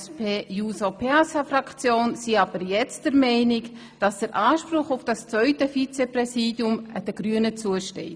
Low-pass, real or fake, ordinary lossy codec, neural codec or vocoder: none; real; none; none